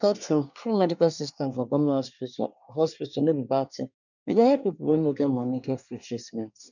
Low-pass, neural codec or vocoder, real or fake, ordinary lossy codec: 7.2 kHz; codec, 24 kHz, 1 kbps, SNAC; fake; none